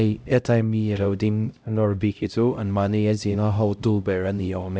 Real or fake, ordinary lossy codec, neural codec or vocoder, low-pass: fake; none; codec, 16 kHz, 0.5 kbps, X-Codec, HuBERT features, trained on LibriSpeech; none